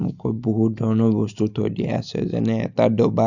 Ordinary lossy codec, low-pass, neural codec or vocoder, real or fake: none; 7.2 kHz; none; real